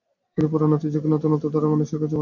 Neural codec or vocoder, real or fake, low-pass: none; real; 7.2 kHz